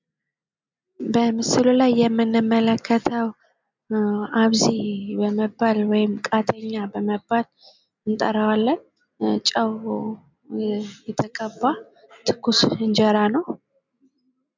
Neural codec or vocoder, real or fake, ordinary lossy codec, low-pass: none; real; MP3, 64 kbps; 7.2 kHz